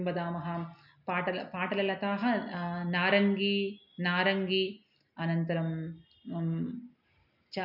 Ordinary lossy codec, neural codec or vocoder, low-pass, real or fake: none; none; 5.4 kHz; real